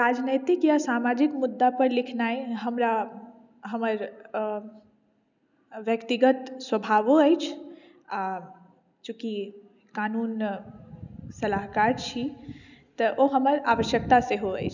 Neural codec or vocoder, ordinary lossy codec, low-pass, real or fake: none; none; 7.2 kHz; real